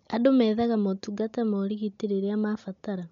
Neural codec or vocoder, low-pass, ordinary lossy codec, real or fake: none; 7.2 kHz; MP3, 64 kbps; real